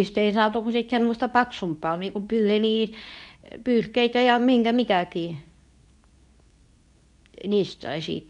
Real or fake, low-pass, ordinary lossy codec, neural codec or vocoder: fake; 10.8 kHz; none; codec, 24 kHz, 0.9 kbps, WavTokenizer, medium speech release version 2